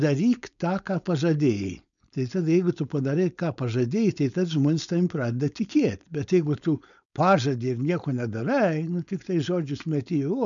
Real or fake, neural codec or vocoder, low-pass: fake; codec, 16 kHz, 4.8 kbps, FACodec; 7.2 kHz